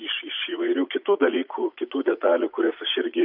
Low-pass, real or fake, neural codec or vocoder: 5.4 kHz; fake; vocoder, 44.1 kHz, 80 mel bands, Vocos